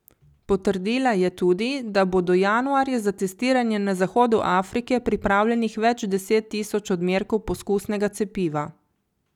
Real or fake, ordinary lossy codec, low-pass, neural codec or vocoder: real; none; 19.8 kHz; none